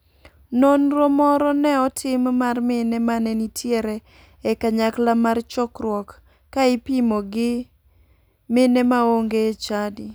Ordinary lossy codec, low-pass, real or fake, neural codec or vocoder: none; none; real; none